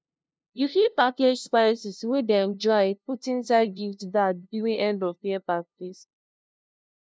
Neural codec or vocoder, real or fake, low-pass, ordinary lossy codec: codec, 16 kHz, 0.5 kbps, FunCodec, trained on LibriTTS, 25 frames a second; fake; none; none